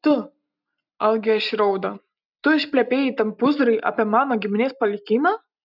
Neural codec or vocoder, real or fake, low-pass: none; real; 5.4 kHz